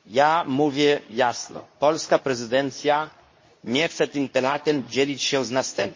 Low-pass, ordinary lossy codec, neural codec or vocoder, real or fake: 7.2 kHz; MP3, 32 kbps; codec, 24 kHz, 0.9 kbps, WavTokenizer, medium speech release version 2; fake